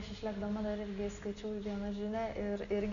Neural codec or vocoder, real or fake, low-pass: none; real; 7.2 kHz